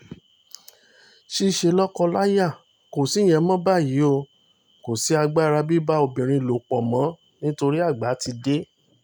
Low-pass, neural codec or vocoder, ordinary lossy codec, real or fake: 19.8 kHz; none; none; real